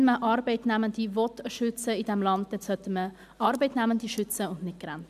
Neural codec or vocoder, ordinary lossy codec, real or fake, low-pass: none; MP3, 96 kbps; real; 14.4 kHz